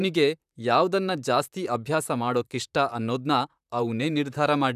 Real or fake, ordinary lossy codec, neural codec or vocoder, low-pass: fake; none; vocoder, 44.1 kHz, 128 mel bands, Pupu-Vocoder; 14.4 kHz